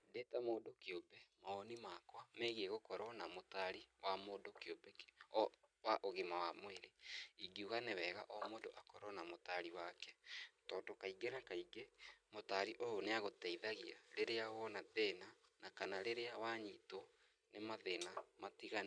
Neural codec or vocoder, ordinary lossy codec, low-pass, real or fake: none; none; 9.9 kHz; real